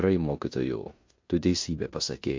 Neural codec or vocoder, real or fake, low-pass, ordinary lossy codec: codec, 16 kHz in and 24 kHz out, 0.9 kbps, LongCat-Audio-Codec, four codebook decoder; fake; 7.2 kHz; MP3, 48 kbps